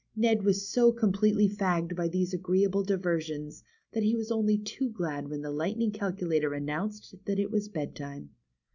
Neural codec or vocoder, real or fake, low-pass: none; real; 7.2 kHz